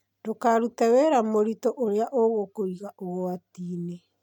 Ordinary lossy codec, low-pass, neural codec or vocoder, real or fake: none; 19.8 kHz; none; real